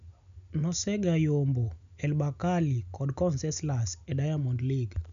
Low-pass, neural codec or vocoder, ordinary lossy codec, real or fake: 7.2 kHz; none; none; real